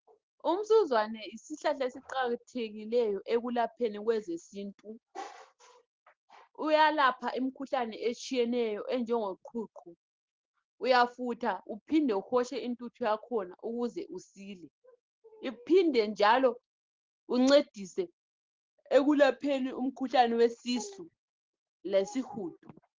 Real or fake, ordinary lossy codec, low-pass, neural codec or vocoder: real; Opus, 16 kbps; 7.2 kHz; none